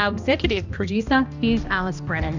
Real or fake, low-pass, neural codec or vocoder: fake; 7.2 kHz; codec, 16 kHz, 1 kbps, X-Codec, HuBERT features, trained on balanced general audio